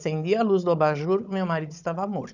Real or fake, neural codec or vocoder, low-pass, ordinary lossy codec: fake; codec, 16 kHz, 16 kbps, FunCodec, trained on Chinese and English, 50 frames a second; 7.2 kHz; none